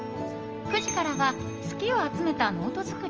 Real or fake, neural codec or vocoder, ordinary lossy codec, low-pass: real; none; Opus, 24 kbps; 7.2 kHz